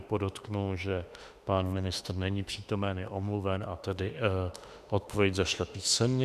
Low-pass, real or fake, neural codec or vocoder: 14.4 kHz; fake; autoencoder, 48 kHz, 32 numbers a frame, DAC-VAE, trained on Japanese speech